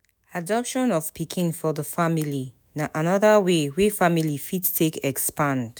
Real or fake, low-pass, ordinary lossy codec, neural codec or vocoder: fake; none; none; autoencoder, 48 kHz, 128 numbers a frame, DAC-VAE, trained on Japanese speech